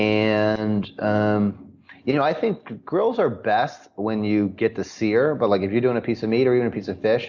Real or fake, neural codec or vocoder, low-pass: real; none; 7.2 kHz